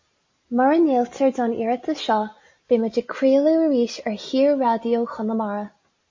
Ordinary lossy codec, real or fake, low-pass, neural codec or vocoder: MP3, 32 kbps; real; 7.2 kHz; none